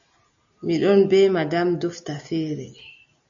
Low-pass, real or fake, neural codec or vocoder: 7.2 kHz; real; none